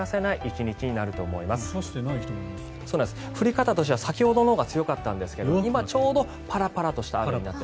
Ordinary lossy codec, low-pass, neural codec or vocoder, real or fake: none; none; none; real